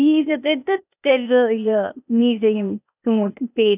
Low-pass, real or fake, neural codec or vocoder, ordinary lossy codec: 3.6 kHz; fake; codec, 16 kHz, 0.8 kbps, ZipCodec; none